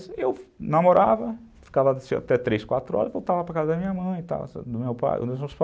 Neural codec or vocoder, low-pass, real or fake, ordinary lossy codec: none; none; real; none